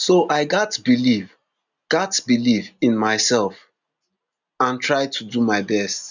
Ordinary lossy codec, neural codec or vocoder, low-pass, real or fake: none; none; 7.2 kHz; real